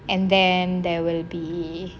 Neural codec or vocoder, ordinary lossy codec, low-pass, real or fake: none; none; none; real